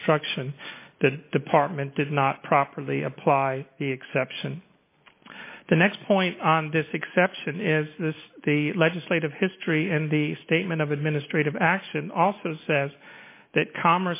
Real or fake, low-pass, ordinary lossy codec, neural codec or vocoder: real; 3.6 kHz; MP3, 24 kbps; none